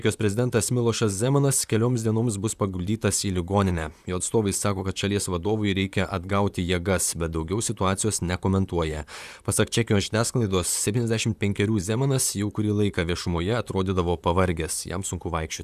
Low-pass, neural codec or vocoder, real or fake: 14.4 kHz; vocoder, 44.1 kHz, 128 mel bands, Pupu-Vocoder; fake